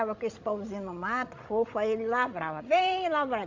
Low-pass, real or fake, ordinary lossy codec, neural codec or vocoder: 7.2 kHz; fake; AAC, 48 kbps; codec, 16 kHz, 16 kbps, FreqCodec, larger model